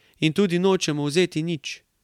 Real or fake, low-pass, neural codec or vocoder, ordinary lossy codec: real; 19.8 kHz; none; MP3, 96 kbps